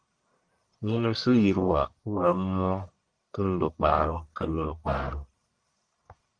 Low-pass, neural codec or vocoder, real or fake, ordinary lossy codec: 9.9 kHz; codec, 44.1 kHz, 1.7 kbps, Pupu-Codec; fake; Opus, 32 kbps